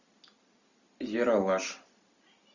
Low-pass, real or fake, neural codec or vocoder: 7.2 kHz; real; none